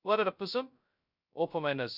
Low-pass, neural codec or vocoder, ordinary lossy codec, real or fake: 5.4 kHz; codec, 16 kHz, 0.2 kbps, FocalCodec; none; fake